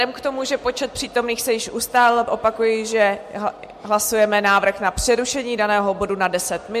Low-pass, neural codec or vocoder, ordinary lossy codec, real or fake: 14.4 kHz; none; MP3, 64 kbps; real